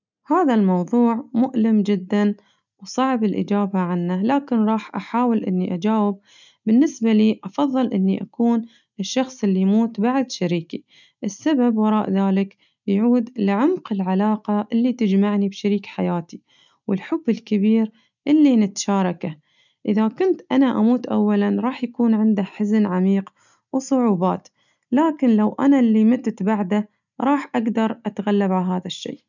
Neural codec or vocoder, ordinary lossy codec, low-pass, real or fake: none; none; 7.2 kHz; real